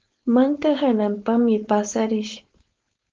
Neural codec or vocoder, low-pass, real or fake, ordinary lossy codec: codec, 16 kHz, 4.8 kbps, FACodec; 7.2 kHz; fake; Opus, 24 kbps